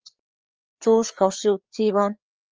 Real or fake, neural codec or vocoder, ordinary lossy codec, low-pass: fake; codec, 16 kHz, 4 kbps, FreqCodec, larger model; Opus, 24 kbps; 7.2 kHz